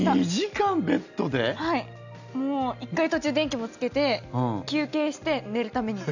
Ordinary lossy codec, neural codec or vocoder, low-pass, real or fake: none; none; 7.2 kHz; real